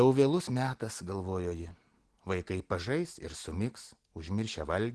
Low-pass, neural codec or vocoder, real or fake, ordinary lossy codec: 10.8 kHz; none; real; Opus, 16 kbps